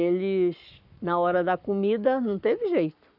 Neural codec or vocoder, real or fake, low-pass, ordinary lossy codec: codec, 44.1 kHz, 7.8 kbps, Pupu-Codec; fake; 5.4 kHz; none